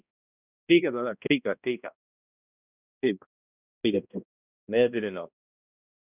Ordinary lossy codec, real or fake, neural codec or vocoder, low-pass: none; fake; codec, 16 kHz, 1 kbps, X-Codec, HuBERT features, trained on balanced general audio; 3.6 kHz